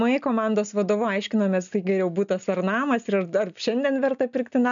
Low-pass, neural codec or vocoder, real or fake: 7.2 kHz; none; real